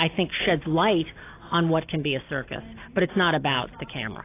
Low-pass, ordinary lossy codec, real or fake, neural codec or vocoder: 3.6 kHz; AAC, 24 kbps; real; none